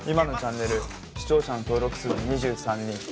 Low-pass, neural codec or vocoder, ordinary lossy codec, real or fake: none; none; none; real